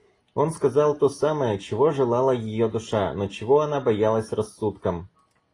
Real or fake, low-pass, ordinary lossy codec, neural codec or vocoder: real; 10.8 kHz; AAC, 32 kbps; none